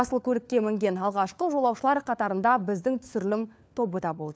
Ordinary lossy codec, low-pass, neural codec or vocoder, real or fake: none; none; codec, 16 kHz, 4 kbps, FunCodec, trained on LibriTTS, 50 frames a second; fake